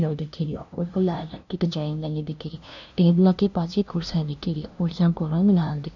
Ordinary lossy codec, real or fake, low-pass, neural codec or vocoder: none; fake; 7.2 kHz; codec, 16 kHz, 0.5 kbps, FunCodec, trained on LibriTTS, 25 frames a second